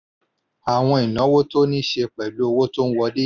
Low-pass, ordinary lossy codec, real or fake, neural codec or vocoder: 7.2 kHz; none; real; none